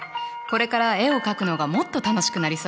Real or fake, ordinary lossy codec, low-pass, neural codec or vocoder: real; none; none; none